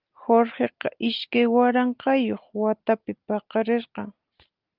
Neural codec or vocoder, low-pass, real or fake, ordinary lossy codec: none; 5.4 kHz; real; Opus, 32 kbps